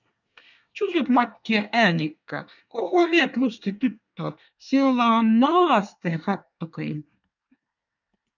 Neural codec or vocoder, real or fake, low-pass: codec, 24 kHz, 1 kbps, SNAC; fake; 7.2 kHz